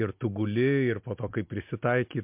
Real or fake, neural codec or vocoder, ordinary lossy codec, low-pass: real; none; MP3, 32 kbps; 3.6 kHz